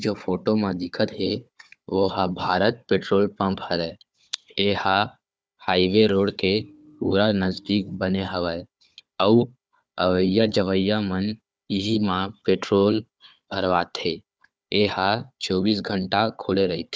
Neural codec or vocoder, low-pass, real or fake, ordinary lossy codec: codec, 16 kHz, 4 kbps, FunCodec, trained on Chinese and English, 50 frames a second; none; fake; none